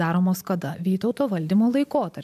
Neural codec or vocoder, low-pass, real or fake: vocoder, 44.1 kHz, 128 mel bands every 512 samples, BigVGAN v2; 14.4 kHz; fake